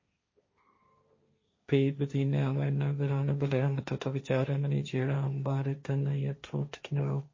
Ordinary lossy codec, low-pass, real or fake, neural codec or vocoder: MP3, 32 kbps; 7.2 kHz; fake; codec, 16 kHz, 1.1 kbps, Voila-Tokenizer